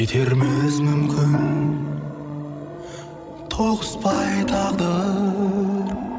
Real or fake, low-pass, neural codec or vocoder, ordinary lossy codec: fake; none; codec, 16 kHz, 16 kbps, FreqCodec, larger model; none